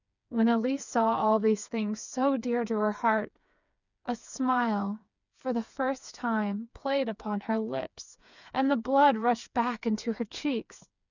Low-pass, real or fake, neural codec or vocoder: 7.2 kHz; fake; codec, 16 kHz, 4 kbps, FreqCodec, smaller model